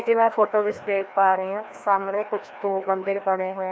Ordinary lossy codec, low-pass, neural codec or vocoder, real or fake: none; none; codec, 16 kHz, 1 kbps, FreqCodec, larger model; fake